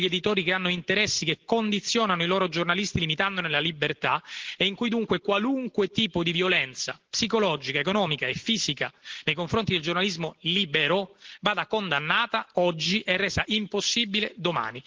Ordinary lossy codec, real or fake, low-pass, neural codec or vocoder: Opus, 16 kbps; real; 7.2 kHz; none